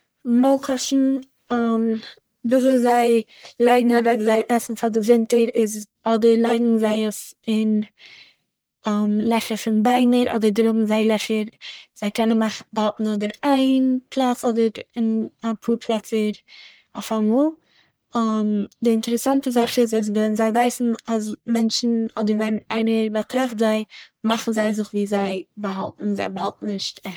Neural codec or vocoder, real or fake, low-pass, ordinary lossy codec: codec, 44.1 kHz, 1.7 kbps, Pupu-Codec; fake; none; none